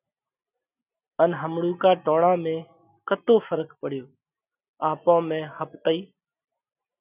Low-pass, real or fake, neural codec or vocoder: 3.6 kHz; real; none